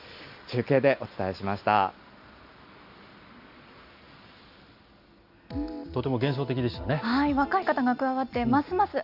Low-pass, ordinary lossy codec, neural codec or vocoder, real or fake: 5.4 kHz; none; none; real